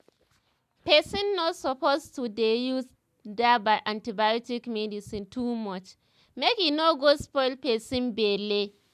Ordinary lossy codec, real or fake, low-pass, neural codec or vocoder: none; real; 14.4 kHz; none